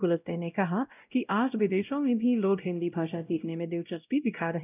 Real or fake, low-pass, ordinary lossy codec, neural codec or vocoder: fake; 3.6 kHz; none; codec, 16 kHz, 0.5 kbps, X-Codec, WavLM features, trained on Multilingual LibriSpeech